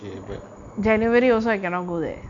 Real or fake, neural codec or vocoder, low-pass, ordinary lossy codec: real; none; 7.2 kHz; none